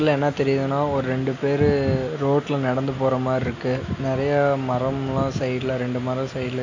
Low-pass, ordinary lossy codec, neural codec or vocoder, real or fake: 7.2 kHz; AAC, 48 kbps; none; real